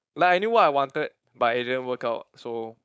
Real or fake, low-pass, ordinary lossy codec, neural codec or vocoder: fake; none; none; codec, 16 kHz, 4.8 kbps, FACodec